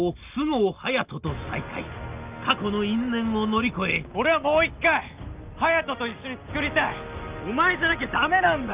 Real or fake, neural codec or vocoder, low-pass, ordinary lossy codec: real; none; 3.6 kHz; Opus, 24 kbps